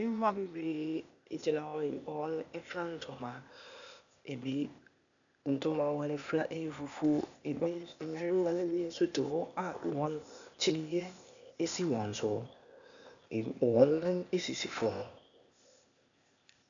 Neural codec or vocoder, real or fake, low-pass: codec, 16 kHz, 0.8 kbps, ZipCodec; fake; 7.2 kHz